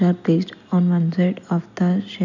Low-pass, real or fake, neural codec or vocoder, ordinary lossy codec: 7.2 kHz; real; none; none